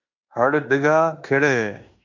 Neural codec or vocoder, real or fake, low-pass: codec, 16 kHz in and 24 kHz out, 0.9 kbps, LongCat-Audio-Codec, fine tuned four codebook decoder; fake; 7.2 kHz